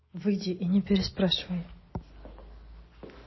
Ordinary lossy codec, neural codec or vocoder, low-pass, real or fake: MP3, 24 kbps; none; 7.2 kHz; real